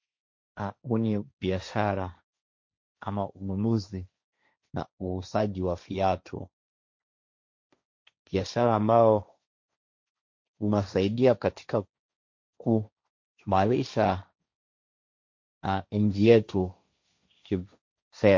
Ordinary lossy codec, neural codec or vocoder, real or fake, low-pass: MP3, 48 kbps; codec, 16 kHz, 1.1 kbps, Voila-Tokenizer; fake; 7.2 kHz